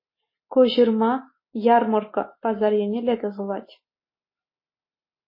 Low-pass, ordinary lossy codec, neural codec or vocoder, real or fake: 5.4 kHz; MP3, 24 kbps; none; real